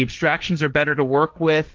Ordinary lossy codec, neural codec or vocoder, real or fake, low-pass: Opus, 24 kbps; codec, 16 kHz, 1.1 kbps, Voila-Tokenizer; fake; 7.2 kHz